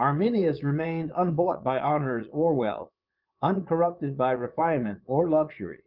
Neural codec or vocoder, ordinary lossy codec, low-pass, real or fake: vocoder, 22.05 kHz, 80 mel bands, Vocos; Opus, 24 kbps; 5.4 kHz; fake